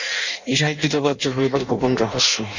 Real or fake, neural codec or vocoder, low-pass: fake; codec, 16 kHz in and 24 kHz out, 0.6 kbps, FireRedTTS-2 codec; 7.2 kHz